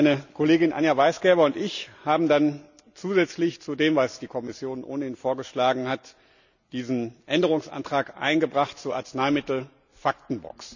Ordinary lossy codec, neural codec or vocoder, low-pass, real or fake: none; none; 7.2 kHz; real